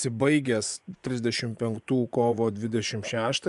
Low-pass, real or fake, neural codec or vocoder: 10.8 kHz; fake; vocoder, 24 kHz, 100 mel bands, Vocos